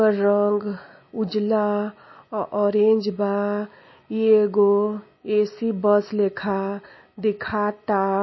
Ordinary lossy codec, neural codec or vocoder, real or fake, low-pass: MP3, 24 kbps; none; real; 7.2 kHz